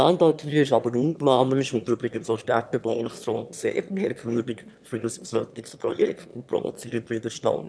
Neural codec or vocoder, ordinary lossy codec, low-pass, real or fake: autoencoder, 22.05 kHz, a latent of 192 numbers a frame, VITS, trained on one speaker; none; none; fake